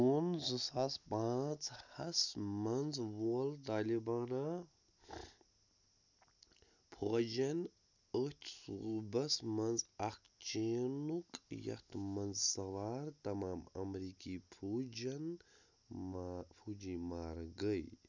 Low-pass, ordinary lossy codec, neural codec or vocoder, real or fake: 7.2 kHz; none; none; real